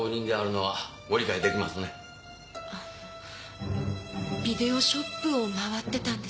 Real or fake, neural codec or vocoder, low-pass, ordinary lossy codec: real; none; none; none